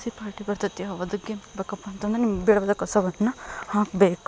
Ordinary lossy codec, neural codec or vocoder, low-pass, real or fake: none; none; none; real